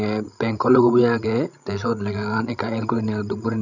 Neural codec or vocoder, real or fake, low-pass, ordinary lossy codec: codec, 16 kHz, 16 kbps, FreqCodec, larger model; fake; 7.2 kHz; none